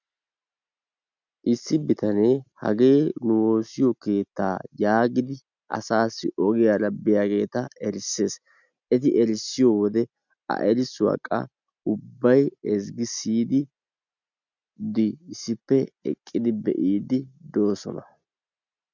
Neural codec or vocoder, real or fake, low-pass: none; real; 7.2 kHz